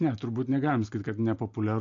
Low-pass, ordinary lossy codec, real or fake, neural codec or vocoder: 7.2 kHz; MP3, 48 kbps; real; none